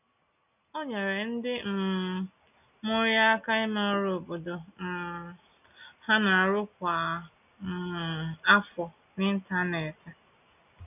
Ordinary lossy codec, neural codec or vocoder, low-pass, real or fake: none; none; 3.6 kHz; real